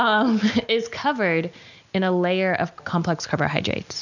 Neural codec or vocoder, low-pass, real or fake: none; 7.2 kHz; real